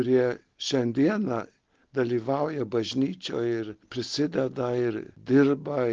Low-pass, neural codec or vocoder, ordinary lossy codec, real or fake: 7.2 kHz; none; Opus, 16 kbps; real